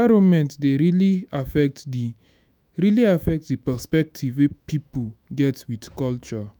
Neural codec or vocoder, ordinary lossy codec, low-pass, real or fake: autoencoder, 48 kHz, 128 numbers a frame, DAC-VAE, trained on Japanese speech; none; none; fake